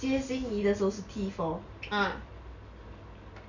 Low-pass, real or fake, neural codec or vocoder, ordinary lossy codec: 7.2 kHz; real; none; none